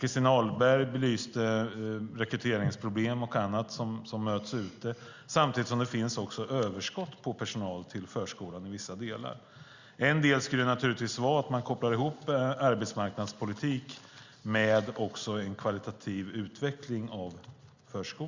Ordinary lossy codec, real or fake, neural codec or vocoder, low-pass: Opus, 64 kbps; real; none; 7.2 kHz